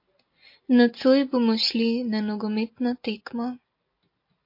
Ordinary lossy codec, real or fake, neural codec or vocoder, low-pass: MP3, 32 kbps; real; none; 5.4 kHz